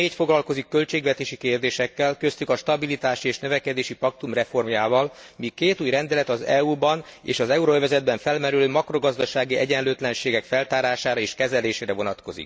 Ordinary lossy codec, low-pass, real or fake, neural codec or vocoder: none; none; real; none